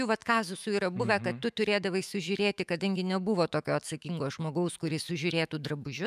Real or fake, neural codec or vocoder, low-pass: fake; autoencoder, 48 kHz, 128 numbers a frame, DAC-VAE, trained on Japanese speech; 14.4 kHz